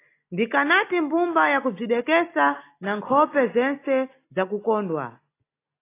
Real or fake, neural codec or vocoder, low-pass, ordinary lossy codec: real; none; 3.6 kHz; AAC, 24 kbps